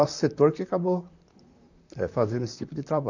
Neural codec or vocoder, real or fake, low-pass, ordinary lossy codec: vocoder, 44.1 kHz, 128 mel bands, Pupu-Vocoder; fake; 7.2 kHz; none